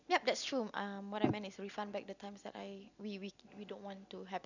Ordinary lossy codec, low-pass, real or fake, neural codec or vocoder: none; 7.2 kHz; real; none